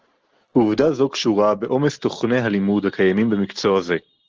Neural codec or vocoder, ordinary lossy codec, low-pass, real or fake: none; Opus, 16 kbps; 7.2 kHz; real